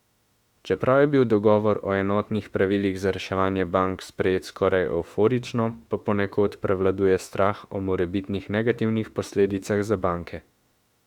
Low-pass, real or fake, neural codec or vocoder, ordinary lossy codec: 19.8 kHz; fake; autoencoder, 48 kHz, 32 numbers a frame, DAC-VAE, trained on Japanese speech; Opus, 64 kbps